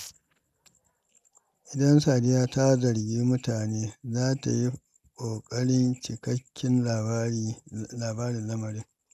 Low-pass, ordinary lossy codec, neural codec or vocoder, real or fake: 14.4 kHz; none; none; real